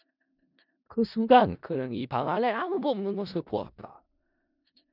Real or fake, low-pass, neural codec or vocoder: fake; 5.4 kHz; codec, 16 kHz in and 24 kHz out, 0.4 kbps, LongCat-Audio-Codec, four codebook decoder